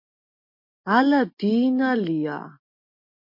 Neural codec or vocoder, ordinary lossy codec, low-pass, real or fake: none; MP3, 32 kbps; 5.4 kHz; real